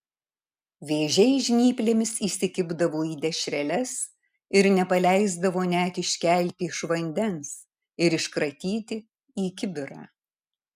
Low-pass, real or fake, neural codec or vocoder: 14.4 kHz; real; none